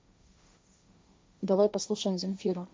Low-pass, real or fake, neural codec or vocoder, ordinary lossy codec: none; fake; codec, 16 kHz, 1.1 kbps, Voila-Tokenizer; none